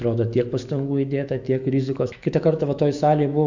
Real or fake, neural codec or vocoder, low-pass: fake; autoencoder, 48 kHz, 128 numbers a frame, DAC-VAE, trained on Japanese speech; 7.2 kHz